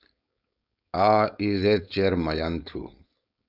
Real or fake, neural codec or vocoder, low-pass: fake; codec, 16 kHz, 4.8 kbps, FACodec; 5.4 kHz